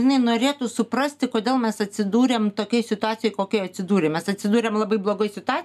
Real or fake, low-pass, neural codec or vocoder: real; 14.4 kHz; none